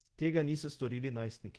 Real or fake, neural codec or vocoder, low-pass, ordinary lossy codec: fake; codec, 24 kHz, 0.5 kbps, DualCodec; 10.8 kHz; Opus, 16 kbps